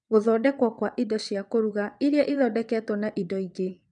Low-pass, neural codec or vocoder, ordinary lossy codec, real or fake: 9.9 kHz; vocoder, 22.05 kHz, 80 mel bands, WaveNeXt; none; fake